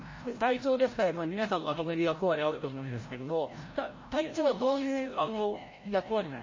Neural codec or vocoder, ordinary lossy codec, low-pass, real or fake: codec, 16 kHz, 0.5 kbps, FreqCodec, larger model; MP3, 32 kbps; 7.2 kHz; fake